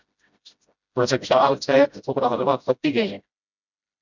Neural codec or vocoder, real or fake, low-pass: codec, 16 kHz, 0.5 kbps, FreqCodec, smaller model; fake; 7.2 kHz